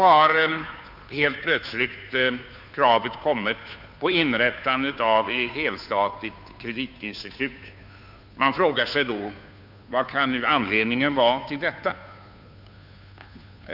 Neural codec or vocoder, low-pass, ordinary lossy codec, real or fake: codec, 16 kHz, 2 kbps, FunCodec, trained on Chinese and English, 25 frames a second; 5.4 kHz; none; fake